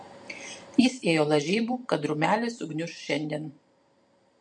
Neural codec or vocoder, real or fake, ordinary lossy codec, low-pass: vocoder, 44.1 kHz, 128 mel bands every 512 samples, BigVGAN v2; fake; MP3, 48 kbps; 10.8 kHz